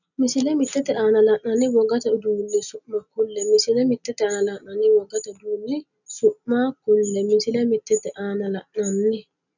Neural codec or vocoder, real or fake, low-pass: none; real; 7.2 kHz